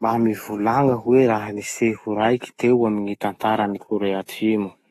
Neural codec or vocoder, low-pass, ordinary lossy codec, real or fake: autoencoder, 48 kHz, 128 numbers a frame, DAC-VAE, trained on Japanese speech; 19.8 kHz; AAC, 32 kbps; fake